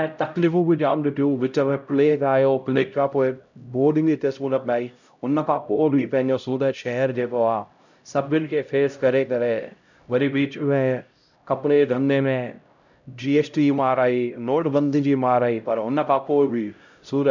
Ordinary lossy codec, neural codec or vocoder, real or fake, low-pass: none; codec, 16 kHz, 0.5 kbps, X-Codec, HuBERT features, trained on LibriSpeech; fake; 7.2 kHz